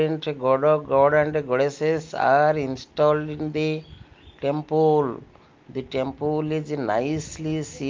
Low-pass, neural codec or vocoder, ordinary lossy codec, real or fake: 7.2 kHz; none; Opus, 32 kbps; real